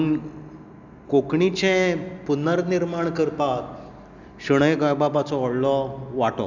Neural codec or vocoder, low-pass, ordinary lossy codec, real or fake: none; 7.2 kHz; none; real